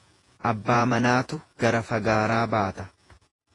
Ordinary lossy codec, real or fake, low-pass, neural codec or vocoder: AAC, 32 kbps; fake; 10.8 kHz; vocoder, 48 kHz, 128 mel bands, Vocos